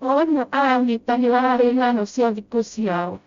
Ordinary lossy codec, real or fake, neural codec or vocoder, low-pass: Opus, 64 kbps; fake; codec, 16 kHz, 0.5 kbps, FreqCodec, smaller model; 7.2 kHz